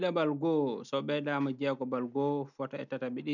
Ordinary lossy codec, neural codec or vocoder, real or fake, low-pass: none; none; real; 7.2 kHz